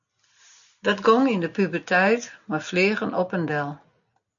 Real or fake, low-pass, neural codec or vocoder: real; 7.2 kHz; none